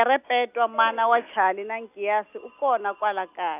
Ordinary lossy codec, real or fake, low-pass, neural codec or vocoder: none; real; 3.6 kHz; none